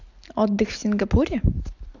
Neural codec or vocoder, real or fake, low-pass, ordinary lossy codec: none; real; 7.2 kHz; AAC, 48 kbps